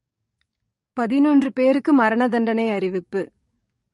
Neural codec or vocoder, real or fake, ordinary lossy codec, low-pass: codec, 44.1 kHz, 7.8 kbps, DAC; fake; MP3, 48 kbps; 14.4 kHz